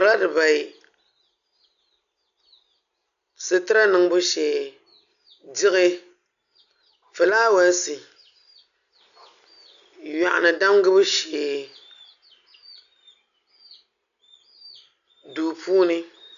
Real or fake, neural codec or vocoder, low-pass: real; none; 7.2 kHz